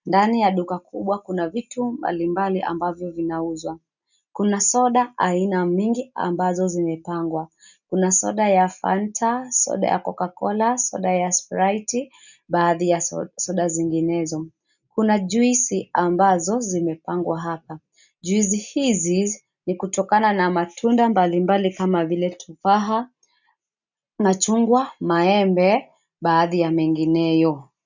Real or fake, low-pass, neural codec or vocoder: real; 7.2 kHz; none